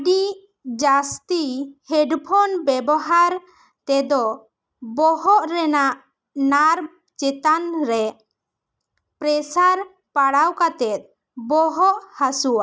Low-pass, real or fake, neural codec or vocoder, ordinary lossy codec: none; real; none; none